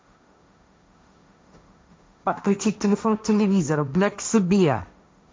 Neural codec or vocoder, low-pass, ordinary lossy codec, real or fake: codec, 16 kHz, 1.1 kbps, Voila-Tokenizer; none; none; fake